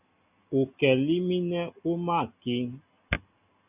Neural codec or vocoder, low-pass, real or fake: none; 3.6 kHz; real